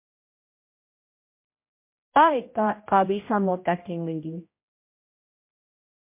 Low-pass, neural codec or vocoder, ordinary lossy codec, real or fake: 3.6 kHz; codec, 16 kHz, 0.5 kbps, X-Codec, HuBERT features, trained on general audio; MP3, 24 kbps; fake